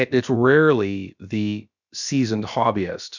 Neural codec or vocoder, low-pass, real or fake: codec, 16 kHz, about 1 kbps, DyCAST, with the encoder's durations; 7.2 kHz; fake